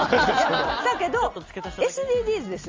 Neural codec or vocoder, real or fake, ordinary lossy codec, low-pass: none; real; Opus, 32 kbps; 7.2 kHz